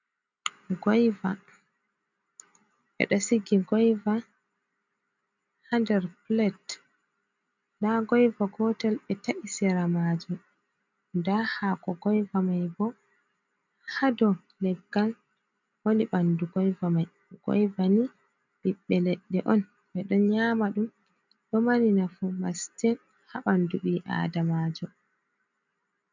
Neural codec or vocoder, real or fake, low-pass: none; real; 7.2 kHz